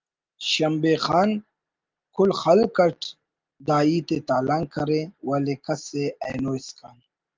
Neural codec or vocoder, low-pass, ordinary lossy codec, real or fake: none; 7.2 kHz; Opus, 32 kbps; real